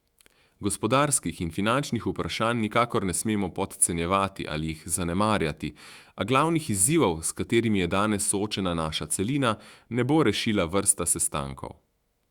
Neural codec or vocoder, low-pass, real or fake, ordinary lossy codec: autoencoder, 48 kHz, 128 numbers a frame, DAC-VAE, trained on Japanese speech; 19.8 kHz; fake; Opus, 64 kbps